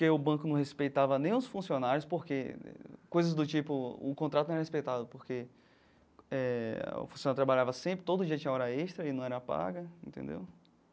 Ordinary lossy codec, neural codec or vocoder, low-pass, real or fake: none; none; none; real